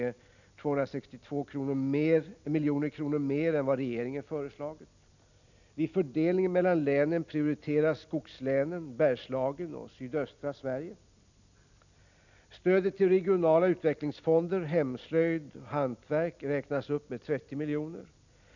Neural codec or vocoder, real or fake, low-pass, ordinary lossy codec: none; real; 7.2 kHz; none